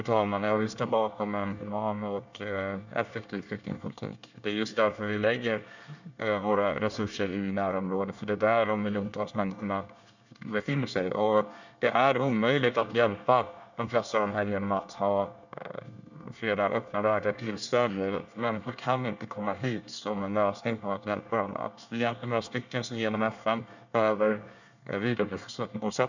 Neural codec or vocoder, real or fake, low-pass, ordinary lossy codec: codec, 24 kHz, 1 kbps, SNAC; fake; 7.2 kHz; none